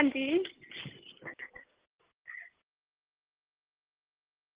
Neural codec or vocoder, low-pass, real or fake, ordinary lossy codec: none; 3.6 kHz; real; Opus, 16 kbps